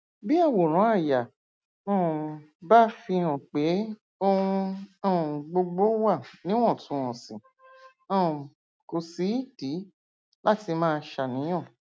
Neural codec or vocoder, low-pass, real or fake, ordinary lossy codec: none; none; real; none